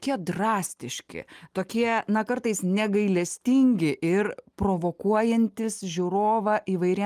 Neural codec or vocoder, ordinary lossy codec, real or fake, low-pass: none; Opus, 32 kbps; real; 14.4 kHz